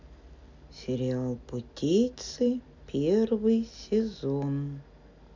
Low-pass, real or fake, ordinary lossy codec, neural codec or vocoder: 7.2 kHz; real; MP3, 64 kbps; none